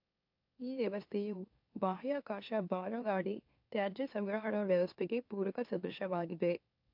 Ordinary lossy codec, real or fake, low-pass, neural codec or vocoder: AAC, 48 kbps; fake; 5.4 kHz; autoencoder, 44.1 kHz, a latent of 192 numbers a frame, MeloTTS